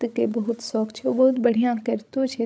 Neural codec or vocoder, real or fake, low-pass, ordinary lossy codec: codec, 16 kHz, 16 kbps, FreqCodec, larger model; fake; none; none